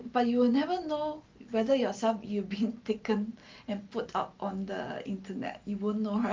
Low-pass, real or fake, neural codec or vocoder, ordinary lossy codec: 7.2 kHz; real; none; Opus, 32 kbps